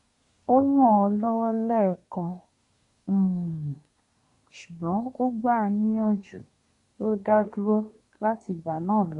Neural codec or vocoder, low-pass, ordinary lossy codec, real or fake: codec, 24 kHz, 1 kbps, SNAC; 10.8 kHz; none; fake